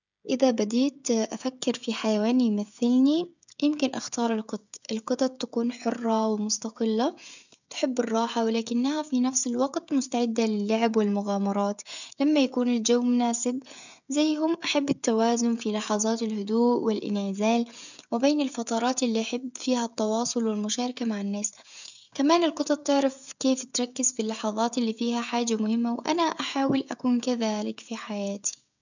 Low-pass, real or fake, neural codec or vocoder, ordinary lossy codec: 7.2 kHz; fake; codec, 16 kHz, 16 kbps, FreqCodec, smaller model; none